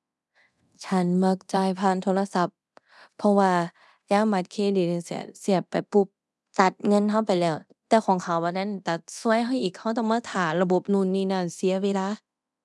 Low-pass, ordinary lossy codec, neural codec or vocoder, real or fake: none; none; codec, 24 kHz, 0.9 kbps, DualCodec; fake